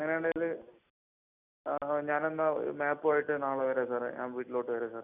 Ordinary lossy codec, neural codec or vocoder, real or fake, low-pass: none; none; real; 3.6 kHz